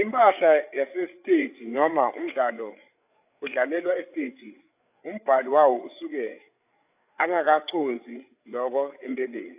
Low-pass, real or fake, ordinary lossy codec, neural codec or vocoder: 3.6 kHz; fake; AAC, 32 kbps; codec, 16 kHz, 8 kbps, FreqCodec, larger model